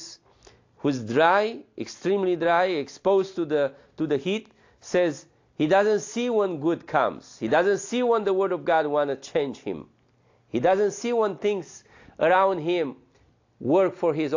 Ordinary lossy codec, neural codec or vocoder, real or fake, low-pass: AAC, 48 kbps; none; real; 7.2 kHz